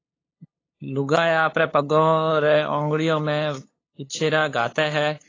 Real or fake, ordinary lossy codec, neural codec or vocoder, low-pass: fake; AAC, 32 kbps; codec, 16 kHz, 8 kbps, FunCodec, trained on LibriTTS, 25 frames a second; 7.2 kHz